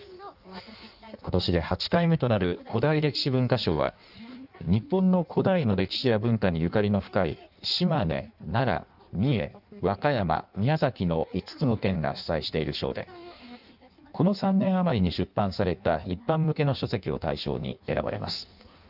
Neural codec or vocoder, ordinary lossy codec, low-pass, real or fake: codec, 16 kHz in and 24 kHz out, 1.1 kbps, FireRedTTS-2 codec; none; 5.4 kHz; fake